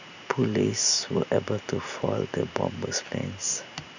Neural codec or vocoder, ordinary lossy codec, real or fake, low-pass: vocoder, 44.1 kHz, 128 mel bands every 512 samples, BigVGAN v2; none; fake; 7.2 kHz